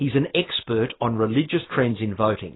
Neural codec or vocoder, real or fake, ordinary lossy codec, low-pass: none; real; AAC, 16 kbps; 7.2 kHz